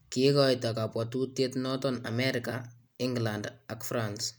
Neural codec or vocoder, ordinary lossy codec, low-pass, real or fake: none; none; none; real